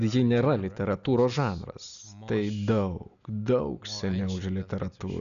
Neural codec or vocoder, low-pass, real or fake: none; 7.2 kHz; real